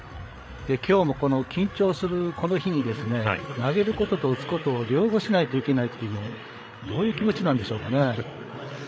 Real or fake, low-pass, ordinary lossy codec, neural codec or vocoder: fake; none; none; codec, 16 kHz, 8 kbps, FreqCodec, larger model